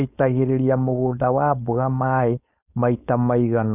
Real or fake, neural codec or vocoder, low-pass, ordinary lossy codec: fake; codec, 16 kHz, 4.8 kbps, FACodec; 3.6 kHz; none